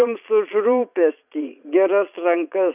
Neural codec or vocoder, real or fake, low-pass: vocoder, 22.05 kHz, 80 mel bands, Vocos; fake; 3.6 kHz